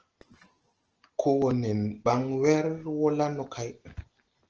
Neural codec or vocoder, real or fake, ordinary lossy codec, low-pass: none; real; Opus, 16 kbps; 7.2 kHz